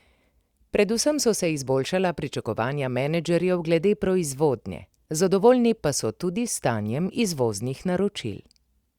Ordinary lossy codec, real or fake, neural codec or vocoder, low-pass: Opus, 64 kbps; real; none; 19.8 kHz